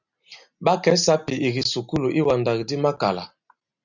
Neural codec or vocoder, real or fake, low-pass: none; real; 7.2 kHz